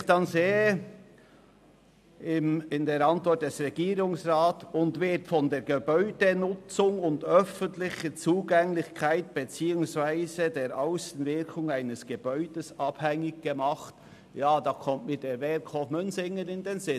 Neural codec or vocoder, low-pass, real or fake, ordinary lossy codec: none; 14.4 kHz; real; none